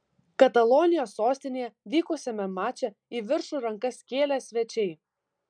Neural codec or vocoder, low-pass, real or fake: none; 9.9 kHz; real